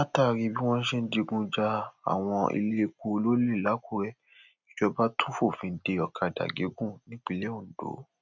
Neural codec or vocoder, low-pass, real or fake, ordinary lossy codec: none; 7.2 kHz; real; none